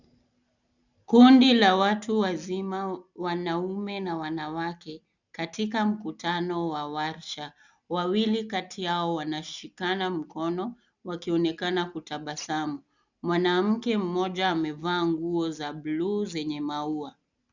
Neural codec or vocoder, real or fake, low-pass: none; real; 7.2 kHz